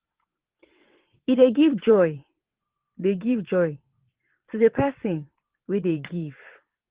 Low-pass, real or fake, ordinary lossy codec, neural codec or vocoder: 3.6 kHz; fake; Opus, 16 kbps; vocoder, 22.05 kHz, 80 mel bands, WaveNeXt